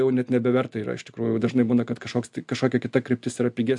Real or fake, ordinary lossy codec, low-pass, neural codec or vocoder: real; MP3, 64 kbps; 10.8 kHz; none